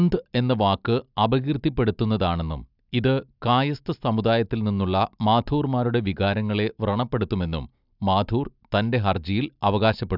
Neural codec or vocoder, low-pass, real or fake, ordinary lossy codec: none; 5.4 kHz; real; none